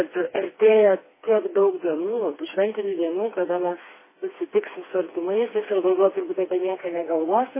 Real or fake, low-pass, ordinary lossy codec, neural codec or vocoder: fake; 3.6 kHz; MP3, 16 kbps; codec, 16 kHz, 2 kbps, FreqCodec, smaller model